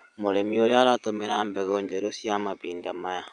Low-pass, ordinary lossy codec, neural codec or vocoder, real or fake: 9.9 kHz; none; vocoder, 22.05 kHz, 80 mel bands, Vocos; fake